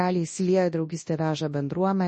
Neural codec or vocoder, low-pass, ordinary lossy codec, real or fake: codec, 24 kHz, 0.9 kbps, WavTokenizer, large speech release; 10.8 kHz; MP3, 32 kbps; fake